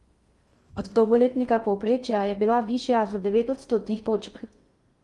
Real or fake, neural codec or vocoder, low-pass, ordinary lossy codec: fake; codec, 16 kHz in and 24 kHz out, 0.6 kbps, FocalCodec, streaming, 2048 codes; 10.8 kHz; Opus, 32 kbps